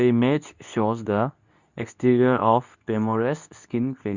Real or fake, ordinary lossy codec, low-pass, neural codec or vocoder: fake; Opus, 64 kbps; 7.2 kHz; codec, 24 kHz, 0.9 kbps, WavTokenizer, medium speech release version 2